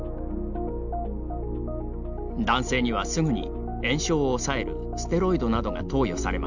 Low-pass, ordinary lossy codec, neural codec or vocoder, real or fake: 7.2 kHz; none; none; real